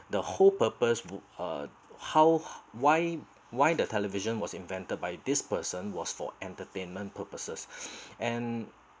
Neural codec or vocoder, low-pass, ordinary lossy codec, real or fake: none; none; none; real